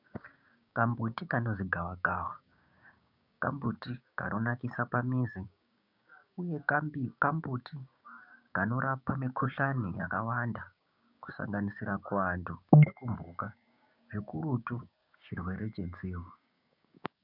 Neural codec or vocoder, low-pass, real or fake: codec, 16 kHz, 6 kbps, DAC; 5.4 kHz; fake